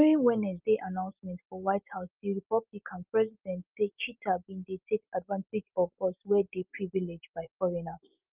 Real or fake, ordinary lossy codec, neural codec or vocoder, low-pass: real; Opus, 24 kbps; none; 3.6 kHz